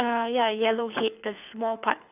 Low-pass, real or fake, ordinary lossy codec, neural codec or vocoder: 3.6 kHz; fake; none; codec, 24 kHz, 6 kbps, HILCodec